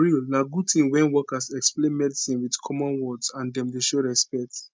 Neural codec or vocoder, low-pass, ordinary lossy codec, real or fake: none; none; none; real